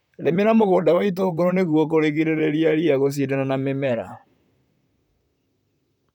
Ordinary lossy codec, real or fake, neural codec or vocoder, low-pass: none; fake; vocoder, 44.1 kHz, 128 mel bands, Pupu-Vocoder; 19.8 kHz